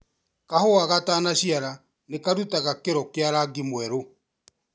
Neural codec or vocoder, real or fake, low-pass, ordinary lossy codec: none; real; none; none